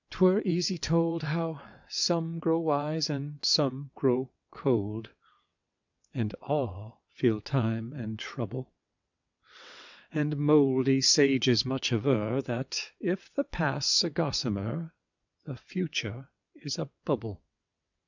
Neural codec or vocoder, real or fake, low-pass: vocoder, 22.05 kHz, 80 mel bands, WaveNeXt; fake; 7.2 kHz